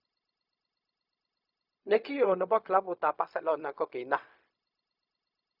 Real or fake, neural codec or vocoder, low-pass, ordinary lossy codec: fake; codec, 16 kHz, 0.4 kbps, LongCat-Audio-Codec; 5.4 kHz; none